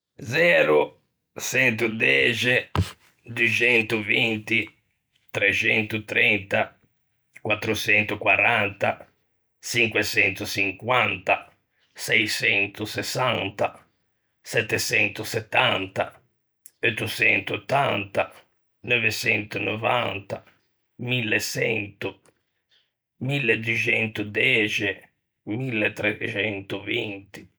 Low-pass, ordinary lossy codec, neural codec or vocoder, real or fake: none; none; none; real